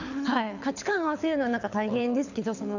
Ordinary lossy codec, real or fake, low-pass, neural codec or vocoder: none; fake; 7.2 kHz; codec, 24 kHz, 6 kbps, HILCodec